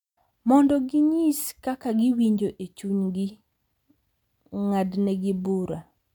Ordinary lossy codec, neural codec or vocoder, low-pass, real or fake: none; none; 19.8 kHz; real